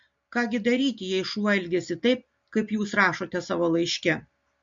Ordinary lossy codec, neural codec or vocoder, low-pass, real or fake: MP3, 48 kbps; none; 7.2 kHz; real